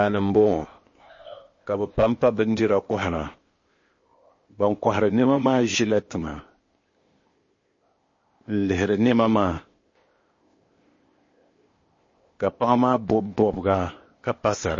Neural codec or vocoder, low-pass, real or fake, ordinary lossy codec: codec, 16 kHz, 0.8 kbps, ZipCodec; 7.2 kHz; fake; MP3, 32 kbps